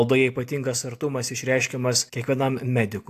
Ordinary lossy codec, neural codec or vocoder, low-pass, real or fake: AAC, 64 kbps; none; 14.4 kHz; real